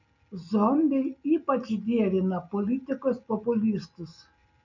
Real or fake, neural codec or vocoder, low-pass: real; none; 7.2 kHz